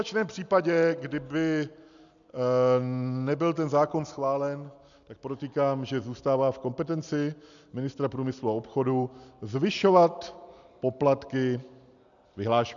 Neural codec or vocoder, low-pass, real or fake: none; 7.2 kHz; real